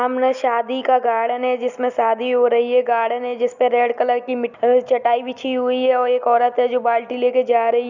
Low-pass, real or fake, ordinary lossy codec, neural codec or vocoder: 7.2 kHz; real; none; none